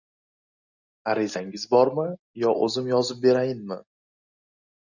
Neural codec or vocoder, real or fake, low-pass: none; real; 7.2 kHz